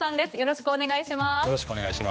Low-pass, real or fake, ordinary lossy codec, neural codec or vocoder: none; fake; none; codec, 16 kHz, 2 kbps, X-Codec, HuBERT features, trained on general audio